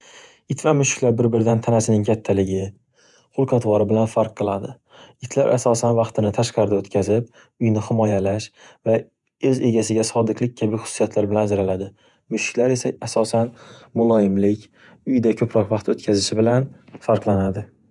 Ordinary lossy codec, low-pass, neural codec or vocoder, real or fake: none; 10.8 kHz; vocoder, 48 kHz, 128 mel bands, Vocos; fake